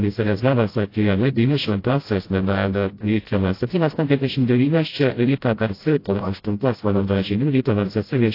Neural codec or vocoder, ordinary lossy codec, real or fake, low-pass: codec, 16 kHz, 0.5 kbps, FreqCodec, smaller model; AAC, 32 kbps; fake; 5.4 kHz